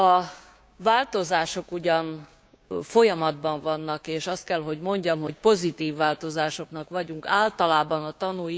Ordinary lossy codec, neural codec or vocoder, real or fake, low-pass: none; codec, 16 kHz, 6 kbps, DAC; fake; none